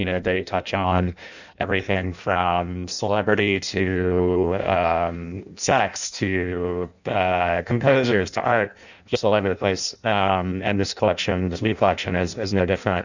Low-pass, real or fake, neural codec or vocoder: 7.2 kHz; fake; codec, 16 kHz in and 24 kHz out, 0.6 kbps, FireRedTTS-2 codec